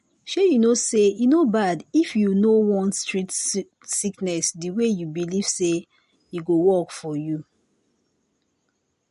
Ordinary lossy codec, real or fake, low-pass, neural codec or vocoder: MP3, 48 kbps; real; 14.4 kHz; none